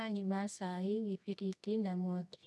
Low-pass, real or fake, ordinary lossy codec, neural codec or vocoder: none; fake; none; codec, 24 kHz, 0.9 kbps, WavTokenizer, medium music audio release